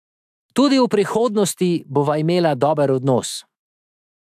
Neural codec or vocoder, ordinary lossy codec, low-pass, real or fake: autoencoder, 48 kHz, 128 numbers a frame, DAC-VAE, trained on Japanese speech; AAC, 96 kbps; 14.4 kHz; fake